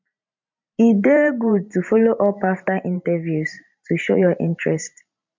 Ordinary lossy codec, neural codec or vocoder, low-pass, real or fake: MP3, 64 kbps; vocoder, 44.1 kHz, 128 mel bands every 512 samples, BigVGAN v2; 7.2 kHz; fake